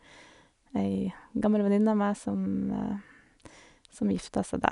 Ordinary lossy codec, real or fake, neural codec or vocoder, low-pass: none; real; none; 10.8 kHz